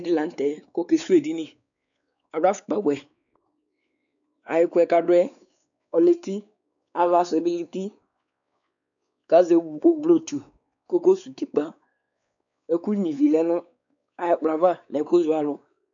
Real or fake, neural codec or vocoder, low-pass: fake; codec, 16 kHz, 4 kbps, X-Codec, WavLM features, trained on Multilingual LibriSpeech; 7.2 kHz